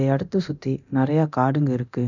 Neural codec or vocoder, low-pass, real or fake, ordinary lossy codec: codec, 24 kHz, 0.9 kbps, DualCodec; 7.2 kHz; fake; none